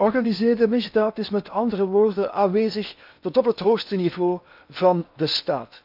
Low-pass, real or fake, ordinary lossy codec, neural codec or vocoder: 5.4 kHz; fake; none; codec, 16 kHz in and 24 kHz out, 0.8 kbps, FocalCodec, streaming, 65536 codes